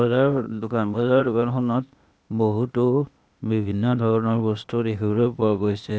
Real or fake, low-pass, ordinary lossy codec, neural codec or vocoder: fake; none; none; codec, 16 kHz, 0.8 kbps, ZipCodec